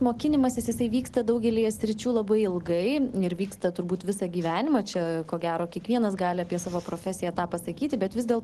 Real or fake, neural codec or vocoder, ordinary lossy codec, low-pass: real; none; Opus, 16 kbps; 10.8 kHz